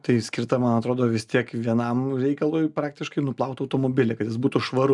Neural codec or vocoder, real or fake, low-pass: none; real; 10.8 kHz